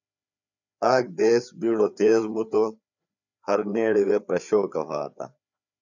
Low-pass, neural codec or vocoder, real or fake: 7.2 kHz; codec, 16 kHz, 4 kbps, FreqCodec, larger model; fake